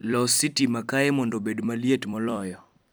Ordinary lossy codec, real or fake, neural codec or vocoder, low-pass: none; fake; vocoder, 44.1 kHz, 128 mel bands every 256 samples, BigVGAN v2; none